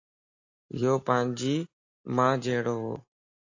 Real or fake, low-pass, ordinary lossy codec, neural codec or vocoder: real; 7.2 kHz; AAC, 48 kbps; none